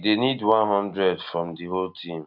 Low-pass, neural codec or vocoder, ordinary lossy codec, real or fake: 5.4 kHz; none; none; real